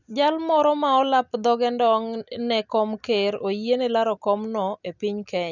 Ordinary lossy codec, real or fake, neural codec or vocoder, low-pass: none; real; none; 7.2 kHz